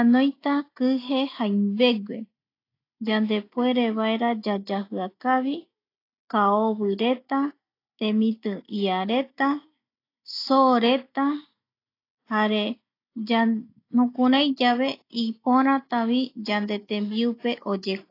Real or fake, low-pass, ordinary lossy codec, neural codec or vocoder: real; 5.4 kHz; AAC, 32 kbps; none